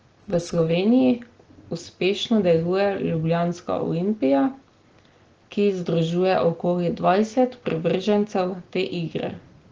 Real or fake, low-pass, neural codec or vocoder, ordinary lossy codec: real; 7.2 kHz; none; Opus, 16 kbps